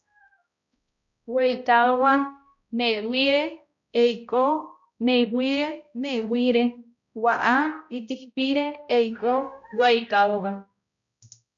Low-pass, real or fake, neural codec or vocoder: 7.2 kHz; fake; codec, 16 kHz, 0.5 kbps, X-Codec, HuBERT features, trained on balanced general audio